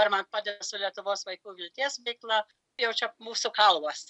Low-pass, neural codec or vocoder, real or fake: 10.8 kHz; none; real